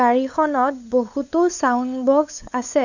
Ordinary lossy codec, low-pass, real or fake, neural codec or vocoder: none; 7.2 kHz; fake; codec, 16 kHz, 8 kbps, FunCodec, trained on LibriTTS, 25 frames a second